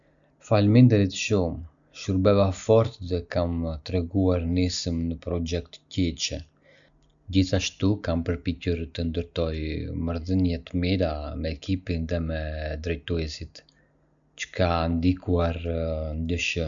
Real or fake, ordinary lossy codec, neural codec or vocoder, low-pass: real; none; none; 7.2 kHz